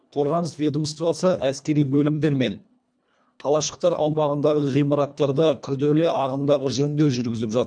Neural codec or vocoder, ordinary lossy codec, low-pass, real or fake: codec, 24 kHz, 1.5 kbps, HILCodec; none; 9.9 kHz; fake